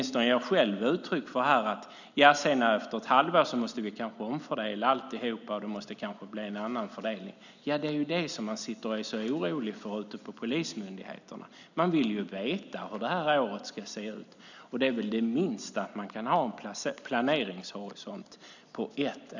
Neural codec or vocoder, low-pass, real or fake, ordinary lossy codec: none; 7.2 kHz; real; none